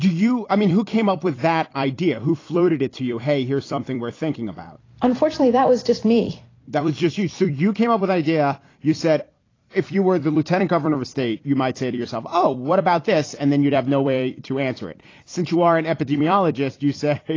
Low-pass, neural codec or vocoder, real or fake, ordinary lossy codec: 7.2 kHz; vocoder, 44.1 kHz, 128 mel bands every 256 samples, BigVGAN v2; fake; AAC, 32 kbps